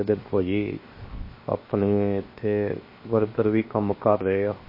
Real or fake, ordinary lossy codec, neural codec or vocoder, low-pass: fake; MP3, 24 kbps; codec, 16 kHz, 0.7 kbps, FocalCodec; 5.4 kHz